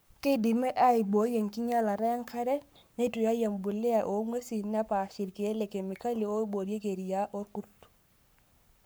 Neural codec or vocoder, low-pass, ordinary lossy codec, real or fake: codec, 44.1 kHz, 7.8 kbps, Pupu-Codec; none; none; fake